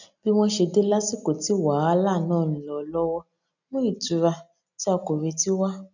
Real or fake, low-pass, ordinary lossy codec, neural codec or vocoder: real; 7.2 kHz; none; none